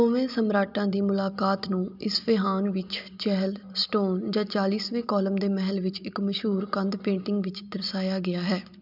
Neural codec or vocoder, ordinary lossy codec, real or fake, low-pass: none; none; real; 5.4 kHz